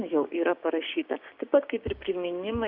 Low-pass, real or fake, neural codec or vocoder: 5.4 kHz; fake; codec, 16 kHz, 6 kbps, DAC